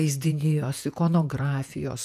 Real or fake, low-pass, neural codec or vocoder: fake; 14.4 kHz; vocoder, 48 kHz, 128 mel bands, Vocos